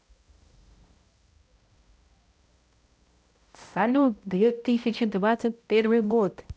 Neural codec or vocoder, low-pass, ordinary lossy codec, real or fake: codec, 16 kHz, 0.5 kbps, X-Codec, HuBERT features, trained on balanced general audio; none; none; fake